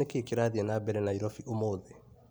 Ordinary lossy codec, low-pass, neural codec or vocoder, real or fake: none; none; none; real